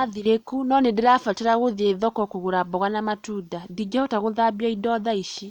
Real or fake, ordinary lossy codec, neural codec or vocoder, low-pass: real; Opus, 64 kbps; none; 19.8 kHz